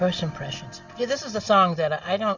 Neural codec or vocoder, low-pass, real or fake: none; 7.2 kHz; real